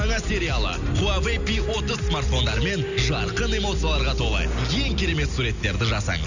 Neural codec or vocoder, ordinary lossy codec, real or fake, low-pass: none; none; real; 7.2 kHz